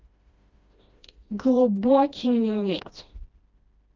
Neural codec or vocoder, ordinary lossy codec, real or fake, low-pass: codec, 16 kHz, 1 kbps, FreqCodec, smaller model; Opus, 32 kbps; fake; 7.2 kHz